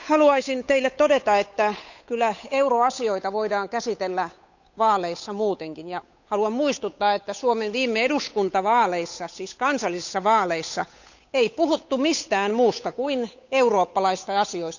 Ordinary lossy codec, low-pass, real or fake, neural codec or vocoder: none; 7.2 kHz; fake; codec, 16 kHz, 8 kbps, FunCodec, trained on Chinese and English, 25 frames a second